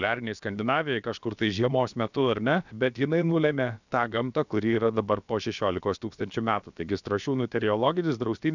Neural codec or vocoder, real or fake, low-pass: codec, 16 kHz, about 1 kbps, DyCAST, with the encoder's durations; fake; 7.2 kHz